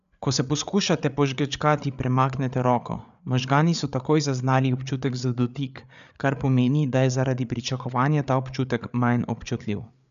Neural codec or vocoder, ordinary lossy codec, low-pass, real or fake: codec, 16 kHz, 8 kbps, FreqCodec, larger model; none; 7.2 kHz; fake